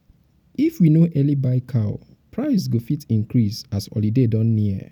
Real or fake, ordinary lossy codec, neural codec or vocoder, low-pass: real; none; none; 19.8 kHz